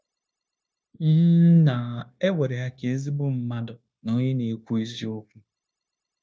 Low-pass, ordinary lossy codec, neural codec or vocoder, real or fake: none; none; codec, 16 kHz, 0.9 kbps, LongCat-Audio-Codec; fake